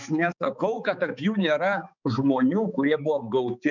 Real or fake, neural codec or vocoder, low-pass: fake; codec, 16 kHz, 4 kbps, X-Codec, HuBERT features, trained on balanced general audio; 7.2 kHz